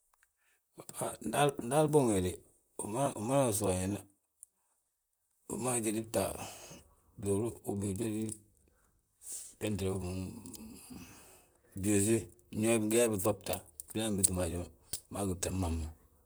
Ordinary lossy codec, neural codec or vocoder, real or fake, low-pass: none; vocoder, 44.1 kHz, 128 mel bands, Pupu-Vocoder; fake; none